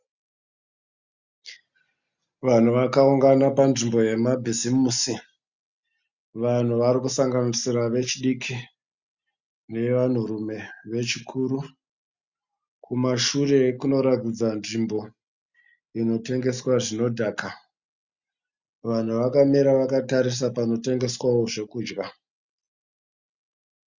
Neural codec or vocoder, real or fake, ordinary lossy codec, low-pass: none; real; Opus, 64 kbps; 7.2 kHz